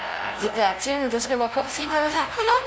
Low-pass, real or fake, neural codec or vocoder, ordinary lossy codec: none; fake; codec, 16 kHz, 0.5 kbps, FunCodec, trained on LibriTTS, 25 frames a second; none